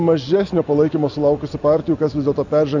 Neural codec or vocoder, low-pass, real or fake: none; 7.2 kHz; real